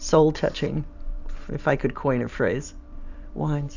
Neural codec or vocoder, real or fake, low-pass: vocoder, 44.1 kHz, 128 mel bands every 512 samples, BigVGAN v2; fake; 7.2 kHz